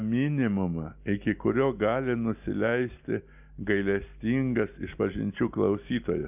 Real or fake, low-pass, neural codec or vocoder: real; 3.6 kHz; none